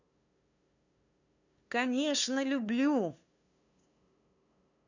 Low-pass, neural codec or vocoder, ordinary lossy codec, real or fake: 7.2 kHz; codec, 16 kHz, 2 kbps, FunCodec, trained on LibriTTS, 25 frames a second; none; fake